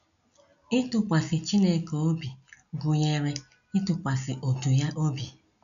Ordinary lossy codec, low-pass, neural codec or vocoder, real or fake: none; 7.2 kHz; none; real